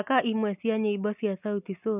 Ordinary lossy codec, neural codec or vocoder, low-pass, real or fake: none; none; 3.6 kHz; real